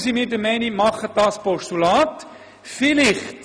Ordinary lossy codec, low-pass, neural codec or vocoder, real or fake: none; none; none; real